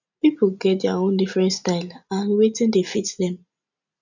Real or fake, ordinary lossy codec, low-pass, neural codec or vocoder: real; none; 7.2 kHz; none